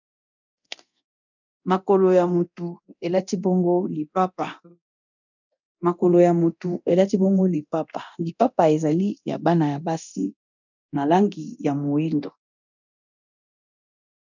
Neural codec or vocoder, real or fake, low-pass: codec, 24 kHz, 0.9 kbps, DualCodec; fake; 7.2 kHz